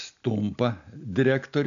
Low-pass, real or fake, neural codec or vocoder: 7.2 kHz; real; none